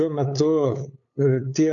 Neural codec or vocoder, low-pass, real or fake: codec, 16 kHz, 8 kbps, FunCodec, trained on LibriTTS, 25 frames a second; 7.2 kHz; fake